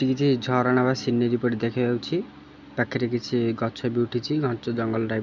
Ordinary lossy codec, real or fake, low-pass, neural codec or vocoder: none; real; 7.2 kHz; none